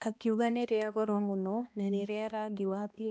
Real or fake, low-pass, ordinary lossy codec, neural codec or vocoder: fake; none; none; codec, 16 kHz, 1 kbps, X-Codec, HuBERT features, trained on balanced general audio